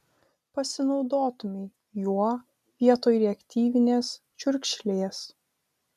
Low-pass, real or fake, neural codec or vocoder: 14.4 kHz; real; none